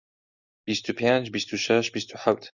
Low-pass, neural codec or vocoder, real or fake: 7.2 kHz; none; real